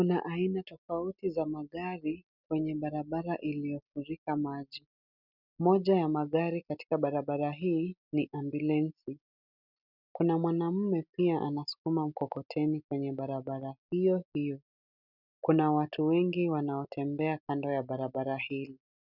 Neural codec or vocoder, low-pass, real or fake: none; 5.4 kHz; real